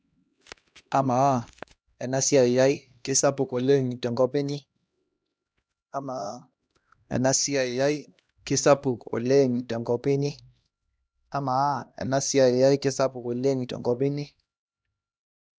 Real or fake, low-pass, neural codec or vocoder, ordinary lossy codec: fake; none; codec, 16 kHz, 1 kbps, X-Codec, HuBERT features, trained on LibriSpeech; none